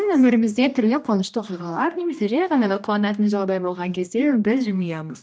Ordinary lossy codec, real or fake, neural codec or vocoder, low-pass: none; fake; codec, 16 kHz, 1 kbps, X-Codec, HuBERT features, trained on general audio; none